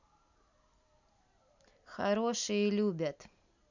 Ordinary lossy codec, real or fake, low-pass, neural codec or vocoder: none; real; 7.2 kHz; none